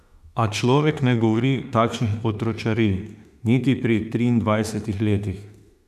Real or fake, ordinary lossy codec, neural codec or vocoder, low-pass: fake; none; autoencoder, 48 kHz, 32 numbers a frame, DAC-VAE, trained on Japanese speech; 14.4 kHz